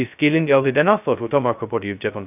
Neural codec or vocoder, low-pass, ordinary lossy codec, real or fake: codec, 16 kHz, 0.2 kbps, FocalCodec; 3.6 kHz; none; fake